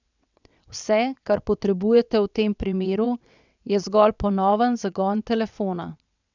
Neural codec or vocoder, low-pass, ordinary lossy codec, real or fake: vocoder, 22.05 kHz, 80 mel bands, WaveNeXt; 7.2 kHz; none; fake